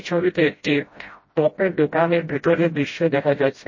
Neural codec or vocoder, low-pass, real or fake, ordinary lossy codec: codec, 16 kHz, 0.5 kbps, FreqCodec, smaller model; 7.2 kHz; fake; MP3, 32 kbps